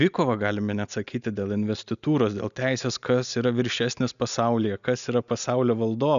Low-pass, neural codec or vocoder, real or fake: 7.2 kHz; none; real